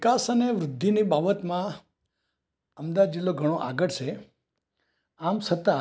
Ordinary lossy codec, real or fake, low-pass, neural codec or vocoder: none; real; none; none